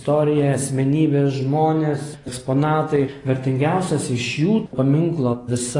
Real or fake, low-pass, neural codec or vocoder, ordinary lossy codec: real; 10.8 kHz; none; AAC, 32 kbps